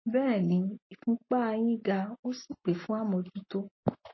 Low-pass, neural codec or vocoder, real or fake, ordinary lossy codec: 7.2 kHz; none; real; MP3, 24 kbps